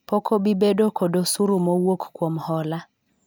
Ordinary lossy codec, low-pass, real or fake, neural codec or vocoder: none; none; real; none